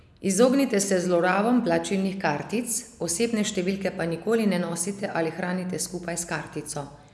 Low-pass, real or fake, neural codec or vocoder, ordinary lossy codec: none; real; none; none